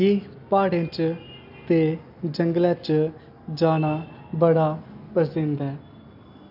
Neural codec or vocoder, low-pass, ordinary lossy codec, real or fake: none; 5.4 kHz; none; real